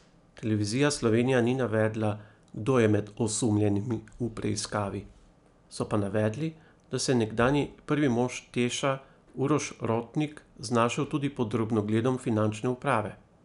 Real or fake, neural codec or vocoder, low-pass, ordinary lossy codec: real; none; 10.8 kHz; none